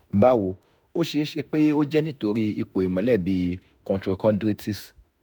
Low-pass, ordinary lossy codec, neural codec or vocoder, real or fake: none; none; autoencoder, 48 kHz, 32 numbers a frame, DAC-VAE, trained on Japanese speech; fake